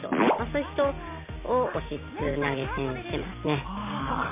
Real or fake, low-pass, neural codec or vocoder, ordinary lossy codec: real; 3.6 kHz; none; MP3, 24 kbps